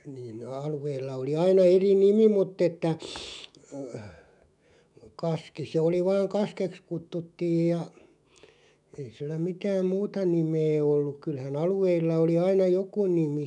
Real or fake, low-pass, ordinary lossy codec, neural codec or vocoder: fake; 10.8 kHz; none; autoencoder, 48 kHz, 128 numbers a frame, DAC-VAE, trained on Japanese speech